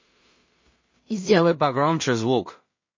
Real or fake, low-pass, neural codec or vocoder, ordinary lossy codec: fake; 7.2 kHz; codec, 16 kHz in and 24 kHz out, 0.4 kbps, LongCat-Audio-Codec, two codebook decoder; MP3, 32 kbps